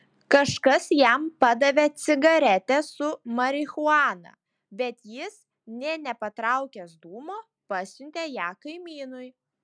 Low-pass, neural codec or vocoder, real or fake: 9.9 kHz; none; real